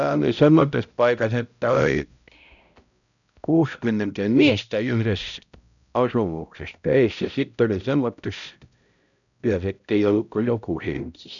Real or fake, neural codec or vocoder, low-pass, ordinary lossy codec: fake; codec, 16 kHz, 0.5 kbps, X-Codec, HuBERT features, trained on balanced general audio; 7.2 kHz; none